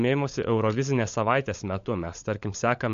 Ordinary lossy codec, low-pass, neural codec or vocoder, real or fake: MP3, 48 kbps; 7.2 kHz; codec, 16 kHz, 16 kbps, FunCodec, trained on LibriTTS, 50 frames a second; fake